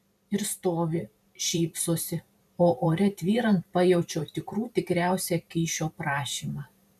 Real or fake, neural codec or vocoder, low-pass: fake; vocoder, 44.1 kHz, 128 mel bands every 512 samples, BigVGAN v2; 14.4 kHz